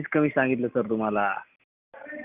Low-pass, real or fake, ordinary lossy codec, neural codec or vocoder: 3.6 kHz; real; Opus, 64 kbps; none